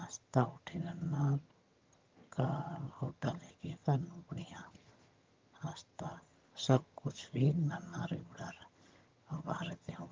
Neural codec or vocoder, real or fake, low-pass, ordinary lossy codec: vocoder, 22.05 kHz, 80 mel bands, HiFi-GAN; fake; 7.2 kHz; Opus, 32 kbps